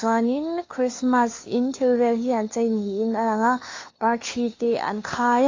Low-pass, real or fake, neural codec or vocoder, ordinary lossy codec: 7.2 kHz; fake; codec, 16 kHz, 2 kbps, FunCodec, trained on Chinese and English, 25 frames a second; AAC, 32 kbps